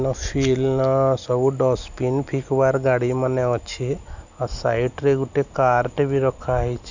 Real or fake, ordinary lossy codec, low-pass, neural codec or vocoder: real; none; 7.2 kHz; none